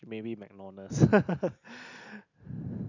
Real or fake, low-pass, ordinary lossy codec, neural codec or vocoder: real; 7.2 kHz; none; none